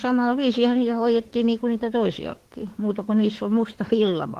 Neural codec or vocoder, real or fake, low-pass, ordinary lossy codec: autoencoder, 48 kHz, 32 numbers a frame, DAC-VAE, trained on Japanese speech; fake; 14.4 kHz; Opus, 16 kbps